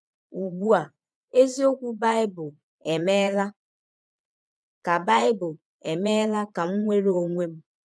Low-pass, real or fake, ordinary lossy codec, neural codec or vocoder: none; fake; none; vocoder, 22.05 kHz, 80 mel bands, Vocos